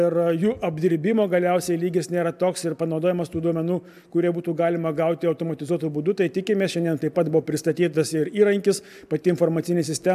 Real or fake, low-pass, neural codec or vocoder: real; 14.4 kHz; none